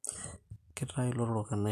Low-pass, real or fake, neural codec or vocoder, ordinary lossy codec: 14.4 kHz; real; none; MP3, 96 kbps